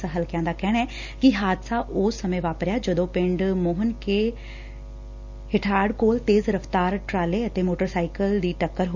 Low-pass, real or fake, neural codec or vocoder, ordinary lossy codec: 7.2 kHz; real; none; none